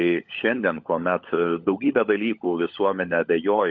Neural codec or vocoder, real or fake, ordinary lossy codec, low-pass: codec, 16 kHz, 16 kbps, FunCodec, trained on LibriTTS, 50 frames a second; fake; MP3, 48 kbps; 7.2 kHz